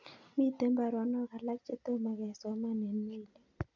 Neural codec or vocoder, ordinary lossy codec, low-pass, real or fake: none; none; 7.2 kHz; real